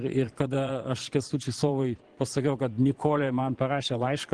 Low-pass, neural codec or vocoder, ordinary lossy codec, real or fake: 9.9 kHz; vocoder, 22.05 kHz, 80 mel bands, Vocos; Opus, 16 kbps; fake